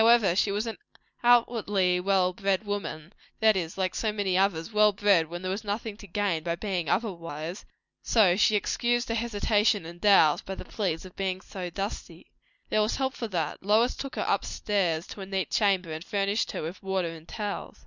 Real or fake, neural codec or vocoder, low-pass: real; none; 7.2 kHz